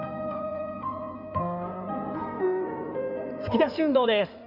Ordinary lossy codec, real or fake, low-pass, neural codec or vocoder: none; fake; 5.4 kHz; codec, 16 kHz in and 24 kHz out, 2.2 kbps, FireRedTTS-2 codec